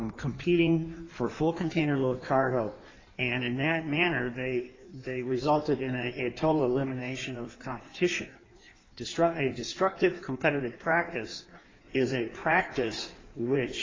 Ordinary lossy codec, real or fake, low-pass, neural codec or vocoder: AAC, 32 kbps; fake; 7.2 kHz; codec, 16 kHz in and 24 kHz out, 1.1 kbps, FireRedTTS-2 codec